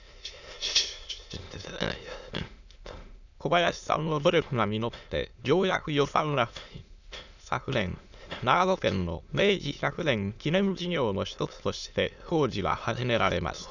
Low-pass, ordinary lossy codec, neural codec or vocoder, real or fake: 7.2 kHz; none; autoencoder, 22.05 kHz, a latent of 192 numbers a frame, VITS, trained on many speakers; fake